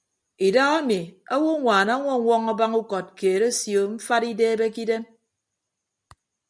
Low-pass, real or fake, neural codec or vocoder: 9.9 kHz; real; none